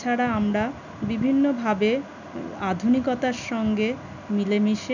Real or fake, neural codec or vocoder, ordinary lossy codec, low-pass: real; none; none; 7.2 kHz